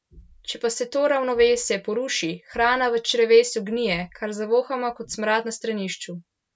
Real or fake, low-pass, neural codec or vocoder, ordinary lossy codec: real; none; none; none